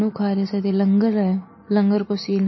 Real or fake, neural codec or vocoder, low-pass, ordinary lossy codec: real; none; 7.2 kHz; MP3, 24 kbps